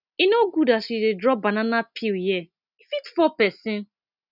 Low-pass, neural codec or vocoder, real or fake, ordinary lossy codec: 5.4 kHz; none; real; none